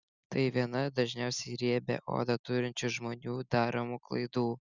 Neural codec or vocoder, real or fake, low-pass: none; real; 7.2 kHz